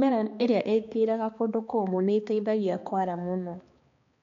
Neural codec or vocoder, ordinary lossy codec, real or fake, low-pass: codec, 16 kHz, 2 kbps, X-Codec, HuBERT features, trained on balanced general audio; MP3, 48 kbps; fake; 7.2 kHz